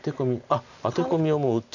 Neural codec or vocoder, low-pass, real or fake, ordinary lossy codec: none; 7.2 kHz; real; none